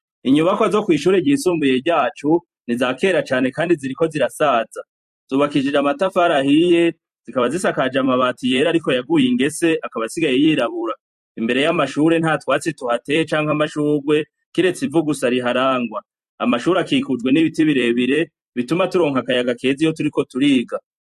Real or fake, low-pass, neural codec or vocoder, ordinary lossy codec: fake; 14.4 kHz; vocoder, 44.1 kHz, 128 mel bands every 256 samples, BigVGAN v2; MP3, 64 kbps